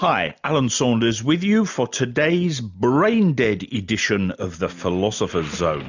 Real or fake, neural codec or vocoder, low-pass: real; none; 7.2 kHz